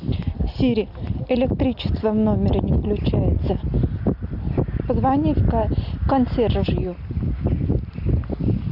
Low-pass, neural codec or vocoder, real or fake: 5.4 kHz; none; real